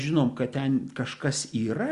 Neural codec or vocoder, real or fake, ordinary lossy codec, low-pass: none; real; Opus, 64 kbps; 10.8 kHz